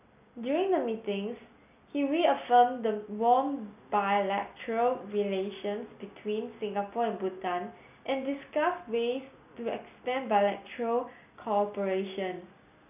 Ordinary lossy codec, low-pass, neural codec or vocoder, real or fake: none; 3.6 kHz; none; real